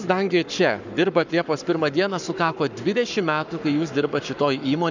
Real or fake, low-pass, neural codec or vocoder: fake; 7.2 kHz; codec, 44.1 kHz, 7.8 kbps, Pupu-Codec